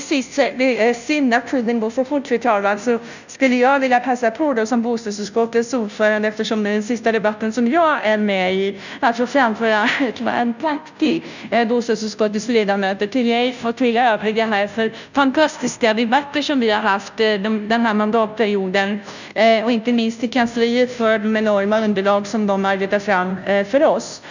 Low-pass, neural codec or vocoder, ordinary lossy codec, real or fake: 7.2 kHz; codec, 16 kHz, 0.5 kbps, FunCodec, trained on Chinese and English, 25 frames a second; none; fake